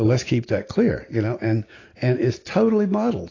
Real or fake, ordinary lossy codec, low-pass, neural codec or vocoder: real; AAC, 32 kbps; 7.2 kHz; none